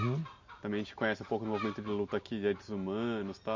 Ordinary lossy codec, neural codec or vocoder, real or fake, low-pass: MP3, 48 kbps; none; real; 7.2 kHz